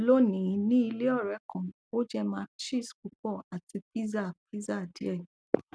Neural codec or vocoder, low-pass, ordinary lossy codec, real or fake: none; none; none; real